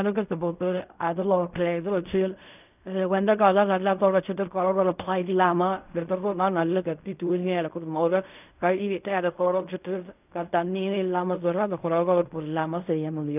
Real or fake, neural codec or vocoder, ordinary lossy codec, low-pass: fake; codec, 16 kHz in and 24 kHz out, 0.4 kbps, LongCat-Audio-Codec, fine tuned four codebook decoder; none; 3.6 kHz